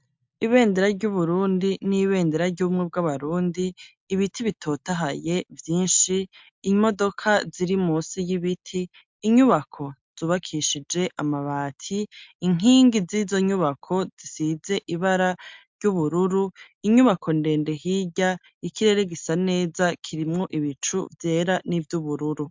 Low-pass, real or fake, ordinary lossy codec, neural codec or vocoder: 7.2 kHz; real; MP3, 64 kbps; none